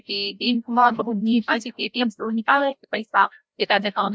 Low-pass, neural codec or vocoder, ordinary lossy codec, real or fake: none; codec, 16 kHz, 0.5 kbps, FreqCodec, larger model; none; fake